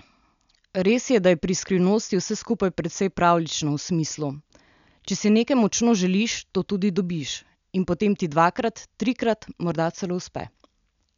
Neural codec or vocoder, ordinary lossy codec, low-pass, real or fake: none; none; 7.2 kHz; real